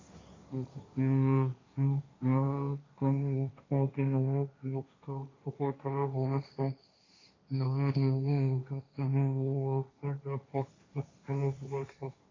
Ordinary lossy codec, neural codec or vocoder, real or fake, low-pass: AAC, 32 kbps; codec, 16 kHz, 1.1 kbps, Voila-Tokenizer; fake; 7.2 kHz